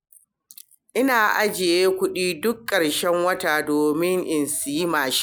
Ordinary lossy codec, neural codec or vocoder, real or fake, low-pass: none; none; real; none